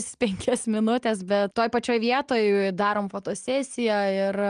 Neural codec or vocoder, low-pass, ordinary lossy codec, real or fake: none; 9.9 kHz; Opus, 32 kbps; real